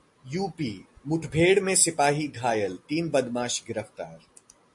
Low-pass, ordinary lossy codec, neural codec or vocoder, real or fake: 10.8 kHz; MP3, 48 kbps; none; real